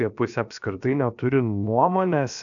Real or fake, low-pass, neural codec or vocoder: fake; 7.2 kHz; codec, 16 kHz, about 1 kbps, DyCAST, with the encoder's durations